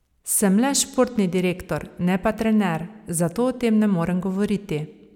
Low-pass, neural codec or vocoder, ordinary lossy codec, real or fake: 19.8 kHz; none; none; real